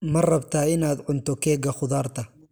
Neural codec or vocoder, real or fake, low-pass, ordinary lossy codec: none; real; none; none